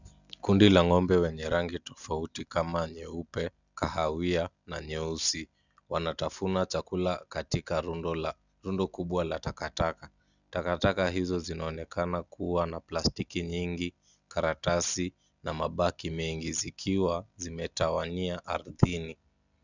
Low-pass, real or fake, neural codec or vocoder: 7.2 kHz; real; none